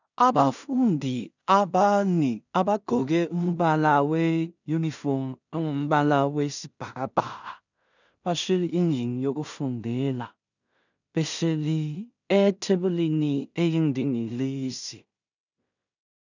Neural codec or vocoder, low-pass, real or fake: codec, 16 kHz in and 24 kHz out, 0.4 kbps, LongCat-Audio-Codec, two codebook decoder; 7.2 kHz; fake